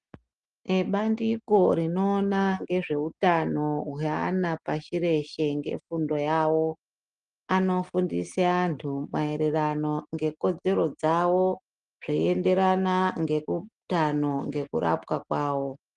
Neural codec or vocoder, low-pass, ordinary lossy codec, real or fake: none; 9.9 kHz; Opus, 24 kbps; real